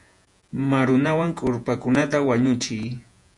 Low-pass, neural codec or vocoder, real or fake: 10.8 kHz; vocoder, 48 kHz, 128 mel bands, Vocos; fake